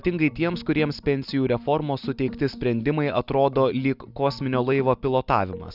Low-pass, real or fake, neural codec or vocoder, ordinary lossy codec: 5.4 kHz; real; none; Opus, 64 kbps